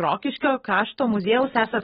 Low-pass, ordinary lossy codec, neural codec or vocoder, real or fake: 19.8 kHz; AAC, 16 kbps; none; real